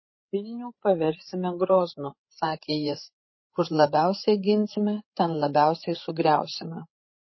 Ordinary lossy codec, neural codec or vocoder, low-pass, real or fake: MP3, 24 kbps; codec, 16 kHz, 8 kbps, FreqCodec, larger model; 7.2 kHz; fake